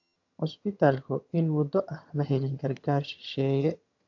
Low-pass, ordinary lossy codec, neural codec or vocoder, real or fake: 7.2 kHz; AAC, 48 kbps; vocoder, 22.05 kHz, 80 mel bands, HiFi-GAN; fake